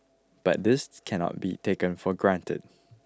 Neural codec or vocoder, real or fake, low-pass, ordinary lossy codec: none; real; none; none